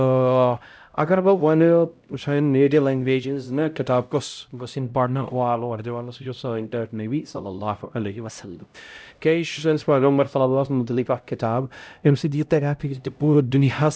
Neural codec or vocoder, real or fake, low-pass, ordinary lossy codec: codec, 16 kHz, 0.5 kbps, X-Codec, HuBERT features, trained on LibriSpeech; fake; none; none